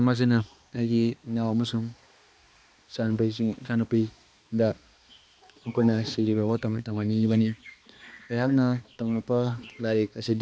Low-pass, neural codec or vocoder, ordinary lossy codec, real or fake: none; codec, 16 kHz, 2 kbps, X-Codec, HuBERT features, trained on balanced general audio; none; fake